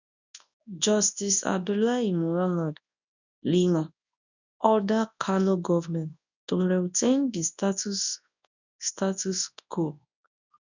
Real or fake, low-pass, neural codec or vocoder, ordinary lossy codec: fake; 7.2 kHz; codec, 24 kHz, 0.9 kbps, WavTokenizer, large speech release; none